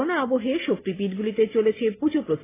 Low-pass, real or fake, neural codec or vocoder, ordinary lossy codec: 3.6 kHz; real; none; AAC, 16 kbps